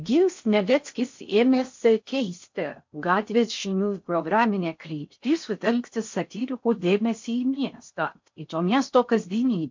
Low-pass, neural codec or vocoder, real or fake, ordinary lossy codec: 7.2 kHz; codec, 16 kHz in and 24 kHz out, 0.6 kbps, FocalCodec, streaming, 4096 codes; fake; MP3, 48 kbps